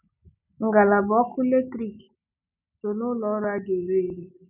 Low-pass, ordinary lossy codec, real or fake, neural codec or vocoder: 3.6 kHz; none; real; none